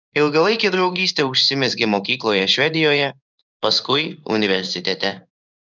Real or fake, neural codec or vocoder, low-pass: fake; codec, 16 kHz in and 24 kHz out, 1 kbps, XY-Tokenizer; 7.2 kHz